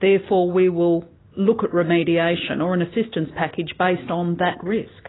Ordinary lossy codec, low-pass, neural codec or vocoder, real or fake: AAC, 16 kbps; 7.2 kHz; none; real